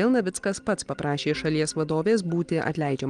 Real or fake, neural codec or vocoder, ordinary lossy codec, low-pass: real; none; Opus, 24 kbps; 9.9 kHz